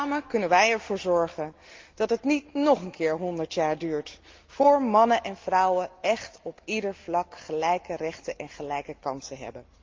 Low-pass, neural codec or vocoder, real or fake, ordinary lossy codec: 7.2 kHz; none; real; Opus, 32 kbps